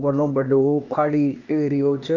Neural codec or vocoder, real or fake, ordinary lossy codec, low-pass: codec, 16 kHz, 0.8 kbps, ZipCodec; fake; none; 7.2 kHz